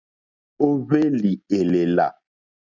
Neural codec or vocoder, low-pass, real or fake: none; 7.2 kHz; real